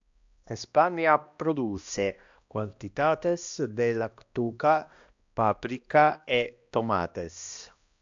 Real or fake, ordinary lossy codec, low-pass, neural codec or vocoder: fake; AAC, 64 kbps; 7.2 kHz; codec, 16 kHz, 1 kbps, X-Codec, HuBERT features, trained on balanced general audio